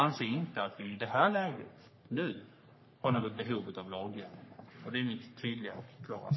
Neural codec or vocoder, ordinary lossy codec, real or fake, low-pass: codec, 44.1 kHz, 3.4 kbps, Pupu-Codec; MP3, 24 kbps; fake; 7.2 kHz